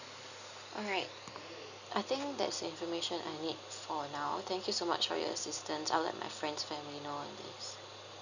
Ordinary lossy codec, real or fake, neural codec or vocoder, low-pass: none; real; none; 7.2 kHz